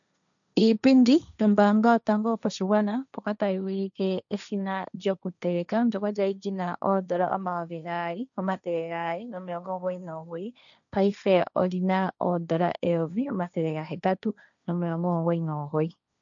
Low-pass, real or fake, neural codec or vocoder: 7.2 kHz; fake; codec, 16 kHz, 1.1 kbps, Voila-Tokenizer